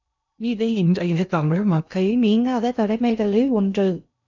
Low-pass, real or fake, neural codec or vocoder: 7.2 kHz; fake; codec, 16 kHz in and 24 kHz out, 0.6 kbps, FocalCodec, streaming, 2048 codes